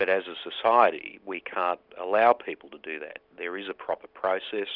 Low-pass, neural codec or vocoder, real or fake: 5.4 kHz; none; real